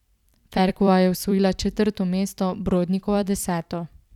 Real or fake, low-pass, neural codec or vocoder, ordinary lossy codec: fake; 19.8 kHz; vocoder, 44.1 kHz, 128 mel bands every 256 samples, BigVGAN v2; none